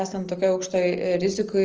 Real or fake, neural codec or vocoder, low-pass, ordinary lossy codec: real; none; 7.2 kHz; Opus, 24 kbps